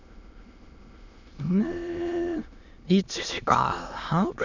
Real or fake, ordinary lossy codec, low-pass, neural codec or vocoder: fake; none; 7.2 kHz; autoencoder, 22.05 kHz, a latent of 192 numbers a frame, VITS, trained on many speakers